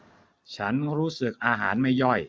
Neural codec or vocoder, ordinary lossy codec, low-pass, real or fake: none; none; none; real